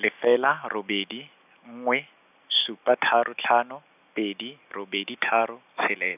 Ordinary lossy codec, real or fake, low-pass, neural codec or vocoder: none; real; 3.6 kHz; none